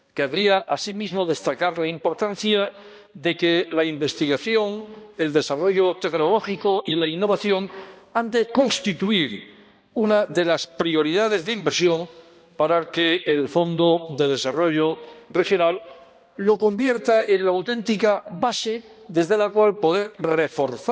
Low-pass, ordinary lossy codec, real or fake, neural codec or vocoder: none; none; fake; codec, 16 kHz, 1 kbps, X-Codec, HuBERT features, trained on balanced general audio